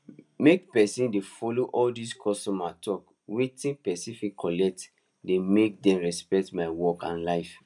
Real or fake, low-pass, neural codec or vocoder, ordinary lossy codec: real; 10.8 kHz; none; none